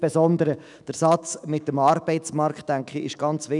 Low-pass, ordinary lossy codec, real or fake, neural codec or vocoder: 10.8 kHz; none; fake; autoencoder, 48 kHz, 128 numbers a frame, DAC-VAE, trained on Japanese speech